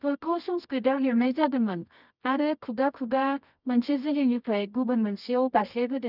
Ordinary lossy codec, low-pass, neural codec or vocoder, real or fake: none; 5.4 kHz; codec, 24 kHz, 0.9 kbps, WavTokenizer, medium music audio release; fake